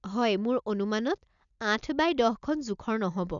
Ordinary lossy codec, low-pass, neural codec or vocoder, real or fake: none; 7.2 kHz; none; real